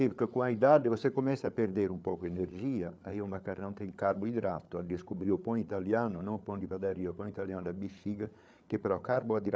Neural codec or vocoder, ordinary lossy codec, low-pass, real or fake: codec, 16 kHz, 8 kbps, FreqCodec, larger model; none; none; fake